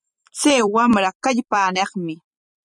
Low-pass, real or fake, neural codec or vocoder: 10.8 kHz; fake; vocoder, 44.1 kHz, 128 mel bands every 256 samples, BigVGAN v2